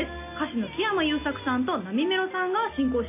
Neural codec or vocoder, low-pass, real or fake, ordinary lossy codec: none; 3.6 kHz; real; AAC, 24 kbps